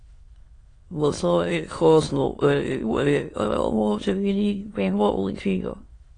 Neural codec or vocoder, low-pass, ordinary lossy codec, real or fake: autoencoder, 22.05 kHz, a latent of 192 numbers a frame, VITS, trained on many speakers; 9.9 kHz; AAC, 32 kbps; fake